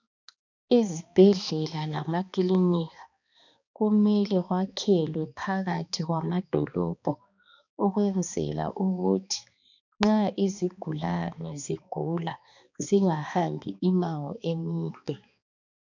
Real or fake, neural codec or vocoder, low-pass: fake; codec, 16 kHz, 2 kbps, X-Codec, HuBERT features, trained on balanced general audio; 7.2 kHz